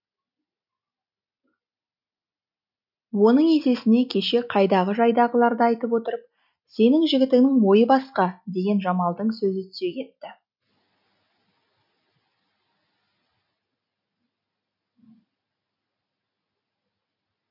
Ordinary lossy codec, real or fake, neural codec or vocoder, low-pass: none; real; none; 5.4 kHz